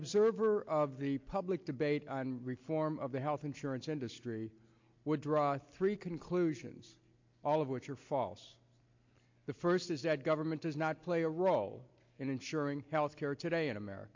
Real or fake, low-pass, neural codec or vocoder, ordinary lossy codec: real; 7.2 kHz; none; MP3, 48 kbps